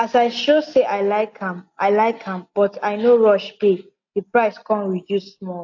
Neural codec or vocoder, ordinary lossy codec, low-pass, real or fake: none; AAC, 48 kbps; 7.2 kHz; real